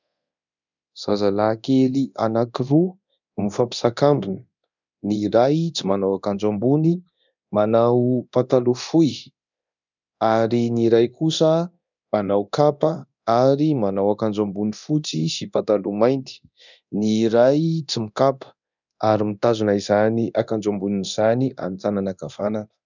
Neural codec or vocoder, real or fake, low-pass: codec, 24 kHz, 0.9 kbps, DualCodec; fake; 7.2 kHz